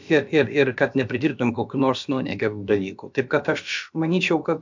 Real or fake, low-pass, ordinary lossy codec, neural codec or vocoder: fake; 7.2 kHz; MP3, 64 kbps; codec, 16 kHz, about 1 kbps, DyCAST, with the encoder's durations